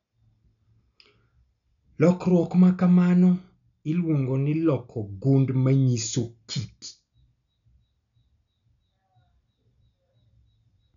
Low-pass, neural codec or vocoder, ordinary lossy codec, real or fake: 7.2 kHz; none; none; real